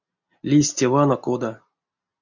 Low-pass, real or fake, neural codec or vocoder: 7.2 kHz; real; none